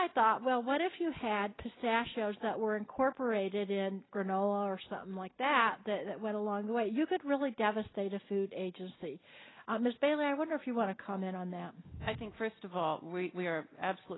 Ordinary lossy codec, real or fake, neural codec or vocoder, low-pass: AAC, 16 kbps; real; none; 7.2 kHz